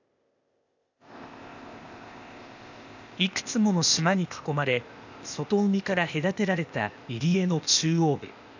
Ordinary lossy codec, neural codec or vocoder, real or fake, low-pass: none; codec, 16 kHz, 0.8 kbps, ZipCodec; fake; 7.2 kHz